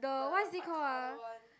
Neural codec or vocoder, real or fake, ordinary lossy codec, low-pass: none; real; none; none